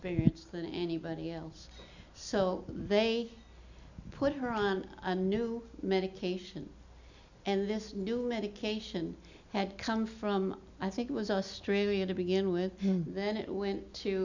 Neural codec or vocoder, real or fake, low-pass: none; real; 7.2 kHz